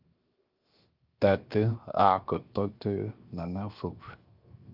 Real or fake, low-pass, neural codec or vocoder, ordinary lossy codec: fake; 5.4 kHz; codec, 16 kHz, 0.7 kbps, FocalCodec; Opus, 32 kbps